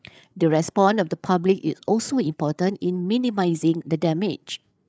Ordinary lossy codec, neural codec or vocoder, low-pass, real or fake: none; codec, 16 kHz, 8 kbps, FreqCodec, larger model; none; fake